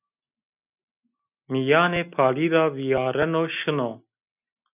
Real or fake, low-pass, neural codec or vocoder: real; 3.6 kHz; none